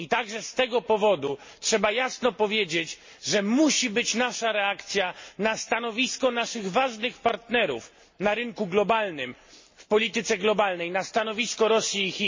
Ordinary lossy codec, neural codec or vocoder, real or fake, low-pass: MP3, 32 kbps; none; real; 7.2 kHz